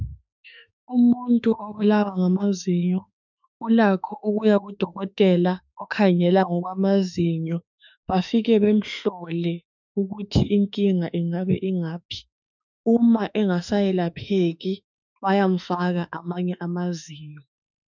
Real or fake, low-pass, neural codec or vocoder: fake; 7.2 kHz; autoencoder, 48 kHz, 32 numbers a frame, DAC-VAE, trained on Japanese speech